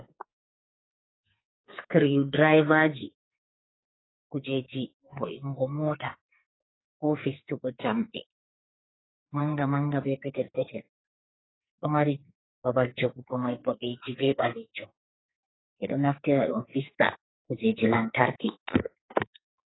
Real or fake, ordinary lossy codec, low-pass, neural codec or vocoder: fake; AAC, 16 kbps; 7.2 kHz; codec, 44.1 kHz, 2.6 kbps, SNAC